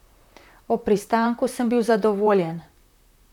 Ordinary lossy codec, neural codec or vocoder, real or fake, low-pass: none; vocoder, 44.1 kHz, 128 mel bands, Pupu-Vocoder; fake; 19.8 kHz